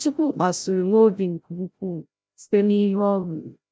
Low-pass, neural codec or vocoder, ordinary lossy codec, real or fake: none; codec, 16 kHz, 0.5 kbps, FreqCodec, larger model; none; fake